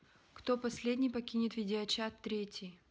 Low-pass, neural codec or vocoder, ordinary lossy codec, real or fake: none; none; none; real